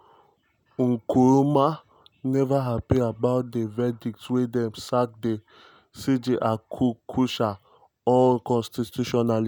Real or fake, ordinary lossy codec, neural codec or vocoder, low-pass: real; none; none; none